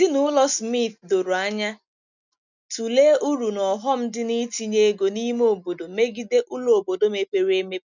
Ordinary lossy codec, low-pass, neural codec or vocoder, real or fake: none; 7.2 kHz; none; real